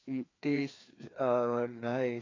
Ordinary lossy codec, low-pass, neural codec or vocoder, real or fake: AAC, 32 kbps; 7.2 kHz; codec, 16 kHz, 2 kbps, FreqCodec, larger model; fake